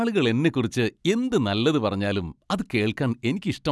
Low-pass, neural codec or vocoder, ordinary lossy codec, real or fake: none; none; none; real